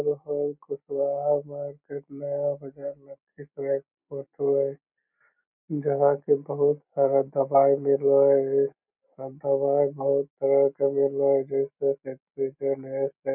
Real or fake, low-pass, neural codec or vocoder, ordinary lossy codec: real; 3.6 kHz; none; none